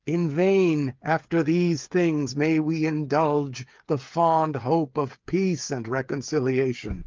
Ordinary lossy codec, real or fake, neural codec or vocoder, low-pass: Opus, 32 kbps; fake; codec, 16 kHz, 8 kbps, FreqCodec, smaller model; 7.2 kHz